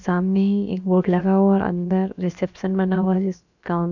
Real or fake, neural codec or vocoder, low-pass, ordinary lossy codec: fake; codec, 16 kHz, about 1 kbps, DyCAST, with the encoder's durations; 7.2 kHz; none